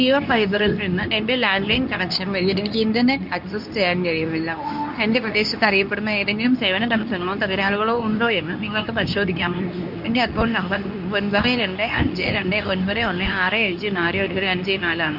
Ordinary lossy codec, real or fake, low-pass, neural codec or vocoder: none; fake; 5.4 kHz; codec, 24 kHz, 0.9 kbps, WavTokenizer, medium speech release version 2